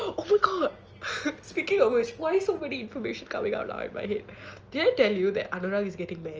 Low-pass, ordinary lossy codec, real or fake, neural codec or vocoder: 7.2 kHz; Opus, 24 kbps; real; none